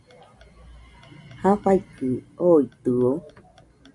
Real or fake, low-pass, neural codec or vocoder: real; 10.8 kHz; none